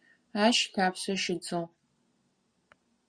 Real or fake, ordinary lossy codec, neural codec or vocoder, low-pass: real; Opus, 64 kbps; none; 9.9 kHz